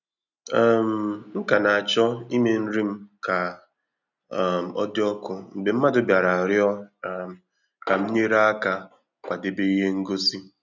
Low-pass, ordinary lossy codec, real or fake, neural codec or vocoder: 7.2 kHz; none; real; none